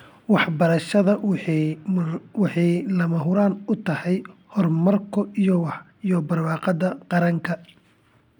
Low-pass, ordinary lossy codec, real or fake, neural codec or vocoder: 19.8 kHz; none; real; none